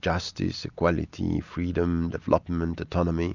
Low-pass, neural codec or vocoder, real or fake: 7.2 kHz; none; real